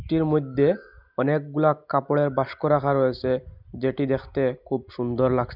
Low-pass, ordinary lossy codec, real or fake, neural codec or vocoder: 5.4 kHz; none; real; none